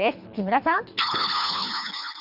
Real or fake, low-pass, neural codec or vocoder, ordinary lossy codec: fake; 5.4 kHz; codec, 24 kHz, 3 kbps, HILCodec; none